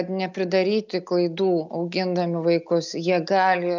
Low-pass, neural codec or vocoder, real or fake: 7.2 kHz; none; real